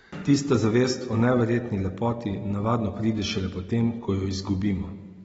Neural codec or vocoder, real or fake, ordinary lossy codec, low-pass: none; real; AAC, 24 kbps; 19.8 kHz